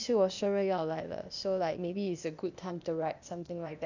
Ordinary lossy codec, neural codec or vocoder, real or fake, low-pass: none; codec, 16 kHz, 0.8 kbps, ZipCodec; fake; 7.2 kHz